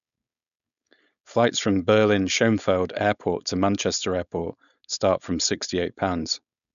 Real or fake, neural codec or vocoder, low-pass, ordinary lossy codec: fake; codec, 16 kHz, 4.8 kbps, FACodec; 7.2 kHz; none